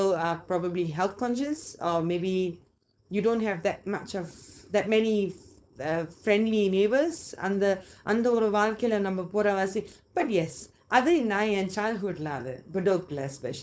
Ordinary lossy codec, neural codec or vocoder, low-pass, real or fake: none; codec, 16 kHz, 4.8 kbps, FACodec; none; fake